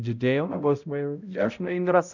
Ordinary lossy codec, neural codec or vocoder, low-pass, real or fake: none; codec, 16 kHz, 0.5 kbps, X-Codec, HuBERT features, trained on balanced general audio; 7.2 kHz; fake